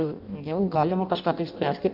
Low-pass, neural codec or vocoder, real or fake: 5.4 kHz; codec, 16 kHz in and 24 kHz out, 0.6 kbps, FireRedTTS-2 codec; fake